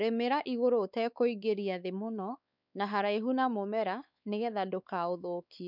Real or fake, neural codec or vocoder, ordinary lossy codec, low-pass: fake; codec, 16 kHz, 4 kbps, X-Codec, WavLM features, trained on Multilingual LibriSpeech; none; 5.4 kHz